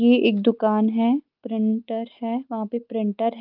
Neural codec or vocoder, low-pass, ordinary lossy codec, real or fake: none; 5.4 kHz; Opus, 24 kbps; real